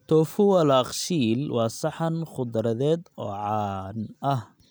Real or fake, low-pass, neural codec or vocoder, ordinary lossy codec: real; none; none; none